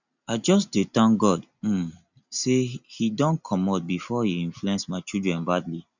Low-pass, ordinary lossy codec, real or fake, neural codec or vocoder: 7.2 kHz; none; real; none